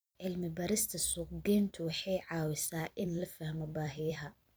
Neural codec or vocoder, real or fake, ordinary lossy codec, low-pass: vocoder, 44.1 kHz, 128 mel bands every 256 samples, BigVGAN v2; fake; none; none